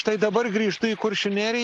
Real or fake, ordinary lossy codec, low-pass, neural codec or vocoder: real; AAC, 64 kbps; 10.8 kHz; none